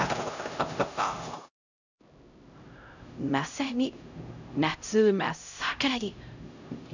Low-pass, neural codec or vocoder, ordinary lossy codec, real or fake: 7.2 kHz; codec, 16 kHz, 0.5 kbps, X-Codec, HuBERT features, trained on LibriSpeech; none; fake